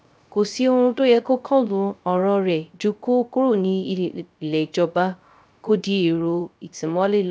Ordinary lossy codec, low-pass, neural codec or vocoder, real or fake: none; none; codec, 16 kHz, 0.3 kbps, FocalCodec; fake